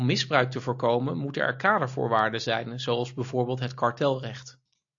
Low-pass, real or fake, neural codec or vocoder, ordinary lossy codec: 7.2 kHz; real; none; AAC, 64 kbps